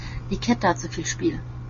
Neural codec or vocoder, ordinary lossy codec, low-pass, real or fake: none; MP3, 32 kbps; 7.2 kHz; real